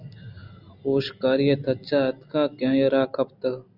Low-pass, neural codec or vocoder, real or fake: 5.4 kHz; none; real